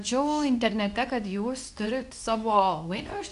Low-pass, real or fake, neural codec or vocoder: 10.8 kHz; fake; codec, 24 kHz, 0.9 kbps, WavTokenizer, medium speech release version 2